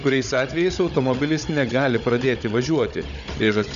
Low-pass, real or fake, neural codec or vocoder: 7.2 kHz; fake; codec, 16 kHz, 16 kbps, FunCodec, trained on Chinese and English, 50 frames a second